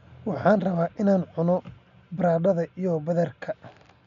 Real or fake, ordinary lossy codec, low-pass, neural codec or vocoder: real; none; 7.2 kHz; none